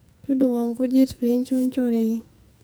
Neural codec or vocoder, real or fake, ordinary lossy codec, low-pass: codec, 44.1 kHz, 2.6 kbps, SNAC; fake; none; none